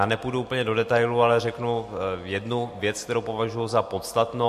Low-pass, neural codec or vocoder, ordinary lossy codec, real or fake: 14.4 kHz; none; MP3, 64 kbps; real